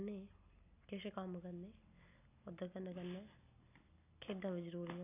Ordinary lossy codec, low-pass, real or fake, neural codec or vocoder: none; 3.6 kHz; real; none